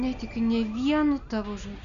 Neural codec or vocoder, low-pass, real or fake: none; 7.2 kHz; real